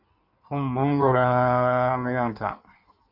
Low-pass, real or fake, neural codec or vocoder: 5.4 kHz; fake; codec, 16 kHz in and 24 kHz out, 1.1 kbps, FireRedTTS-2 codec